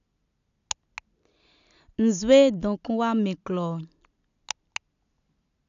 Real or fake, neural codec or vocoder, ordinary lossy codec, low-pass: real; none; none; 7.2 kHz